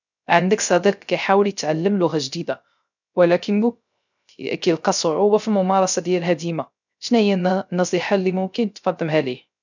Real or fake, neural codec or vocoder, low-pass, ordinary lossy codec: fake; codec, 16 kHz, 0.3 kbps, FocalCodec; 7.2 kHz; none